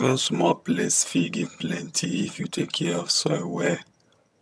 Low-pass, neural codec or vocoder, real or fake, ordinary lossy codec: none; vocoder, 22.05 kHz, 80 mel bands, HiFi-GAN; fake; none